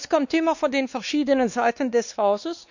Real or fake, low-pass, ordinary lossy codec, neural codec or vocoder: fake; 7.2 kHz; none; codec, 16 kHz, 2 kbps, X-Codec, WavLM features, trained on Multilingual LibriSpeech